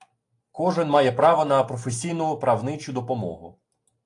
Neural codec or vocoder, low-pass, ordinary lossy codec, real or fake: none; 10.8 kHz; AAC, 48 kbps; real